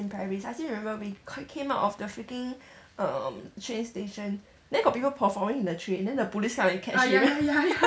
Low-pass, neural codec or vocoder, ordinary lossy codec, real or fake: none; none; none; real